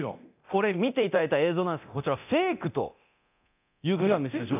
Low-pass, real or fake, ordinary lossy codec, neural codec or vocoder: 3.6 kHz; fake; none; codec, 24 kHz, 0.9 kbps, DualCodec